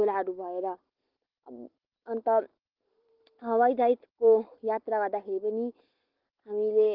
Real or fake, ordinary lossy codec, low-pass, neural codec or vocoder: real; Opus, 16 kbps; 5.4 kHz; none